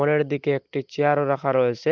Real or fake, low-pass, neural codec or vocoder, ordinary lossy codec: real; 7.2 kHz; none; Opus, 24 kbps